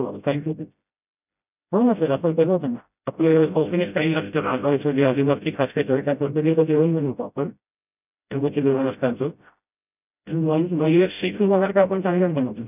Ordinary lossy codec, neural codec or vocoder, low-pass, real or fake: none; codec, 16 kHz, 0.5 kbps, FreqCodec, smaller model; 3.6 kHz; fake